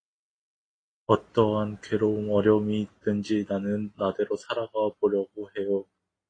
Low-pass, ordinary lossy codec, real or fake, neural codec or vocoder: 9.9 kHz; AAC, 32 kbps; real; none